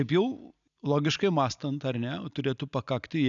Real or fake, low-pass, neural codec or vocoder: real; 7.2 kHz; none